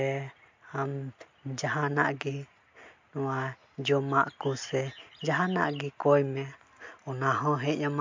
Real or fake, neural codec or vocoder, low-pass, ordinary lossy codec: real; none; 7.2 kHz; MP3, 48 kbps